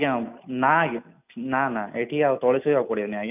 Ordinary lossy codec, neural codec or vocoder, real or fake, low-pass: none; none; real; 3.6 kHz